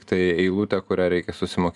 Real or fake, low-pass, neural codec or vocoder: real; 10.8 kHz; none